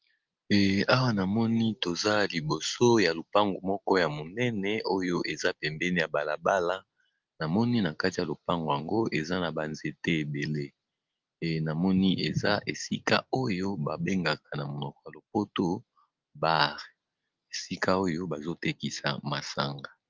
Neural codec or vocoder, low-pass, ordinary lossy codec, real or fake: none; 7.2 kHz; Opus, 32 kbps; real